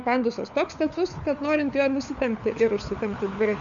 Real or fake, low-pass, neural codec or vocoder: fake; 7.2 kHz; codec, 16 kHz, 4 kbps, FunCodec, trained on LibriTTS, 50 frames a second